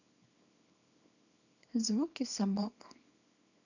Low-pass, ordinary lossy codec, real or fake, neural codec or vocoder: 7.2 kHz; none; fake; codec, 24 kHz, 0.9 kbps, WavTokenizer, small release